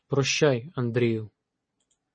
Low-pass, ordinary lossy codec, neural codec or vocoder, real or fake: 9.9 kHz; MP3, 32 kbps; none; real